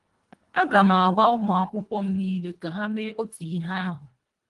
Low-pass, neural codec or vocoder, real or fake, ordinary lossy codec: 10.8 kHz; codec, 24 kHz, 1.5 kbps, HILCodec; fake; Opus, 24 kbps